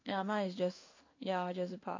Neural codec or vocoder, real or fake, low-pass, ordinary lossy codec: codec, 16 kHz in and 24 kHz out, 1 kbps, XY-Tokenizer; fake; 7.2 kHz; AAC, 48 kbps